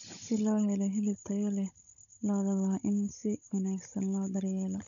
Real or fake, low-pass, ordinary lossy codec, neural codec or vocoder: fake; 7.2 kHz; MP3, 96 kbps; codec, 16 kHz, 16 kbps, FunCodec, trained on Chinese and English, 50 frames a second